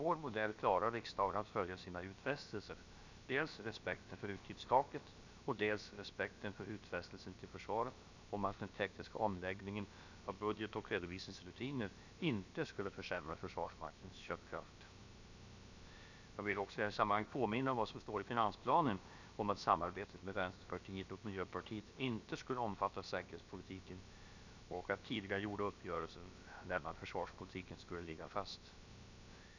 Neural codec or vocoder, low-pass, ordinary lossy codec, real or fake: codec, 16 kHz, about 1 kbps, DyCAST, with the encoder's durations; 7.2 kHz; none; fake